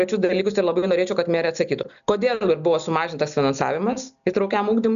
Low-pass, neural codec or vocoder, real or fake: 7.2 kHz; none; real